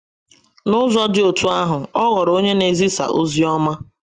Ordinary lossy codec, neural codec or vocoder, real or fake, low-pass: Opus, 32 kbps; none; real; 9.9 kHz